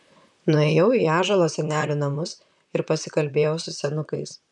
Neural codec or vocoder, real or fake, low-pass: vocoder, 44.1 kHz, 128 mel bands, Pupu-Vocoder; fake; 10.8 kHz